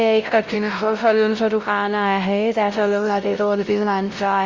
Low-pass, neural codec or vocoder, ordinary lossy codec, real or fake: 7.2 kHz; codec, 16 kHz, 0.5 kbps, X-Codec, WavLM features, trained on Multilingual LibriSpeech; Opus, 32 kbps; fake